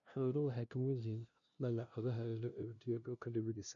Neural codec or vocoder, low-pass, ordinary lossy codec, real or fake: codec, 16 kHz, 0.5 kbps, FunCodec, trained on LibriTTS, 25 frames a second; 7.2 kHz; none; fake